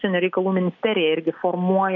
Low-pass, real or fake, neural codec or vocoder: 7.2 kHz; real; none